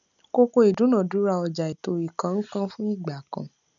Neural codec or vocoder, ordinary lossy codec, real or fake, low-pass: none; none; real; 7.2 kHz